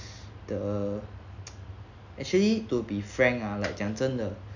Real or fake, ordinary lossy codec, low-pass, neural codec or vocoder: real; none; 7.2 kHz; none